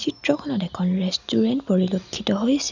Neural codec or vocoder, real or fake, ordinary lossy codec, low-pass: none; real; none; 7.2 kHz